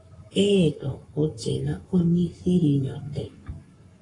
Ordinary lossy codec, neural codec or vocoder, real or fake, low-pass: AAC, 32 kbps; codec, 44.1 kHz, 7.8 kbps, Pupu-Codec; fake; 10.8 kHz